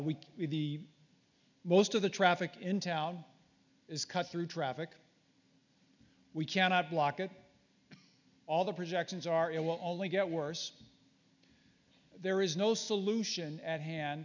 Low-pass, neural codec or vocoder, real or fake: 7.2 kHz; none; real